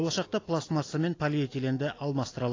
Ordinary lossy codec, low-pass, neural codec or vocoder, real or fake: AAC, 32 kbps; 7.2 kHz; none; real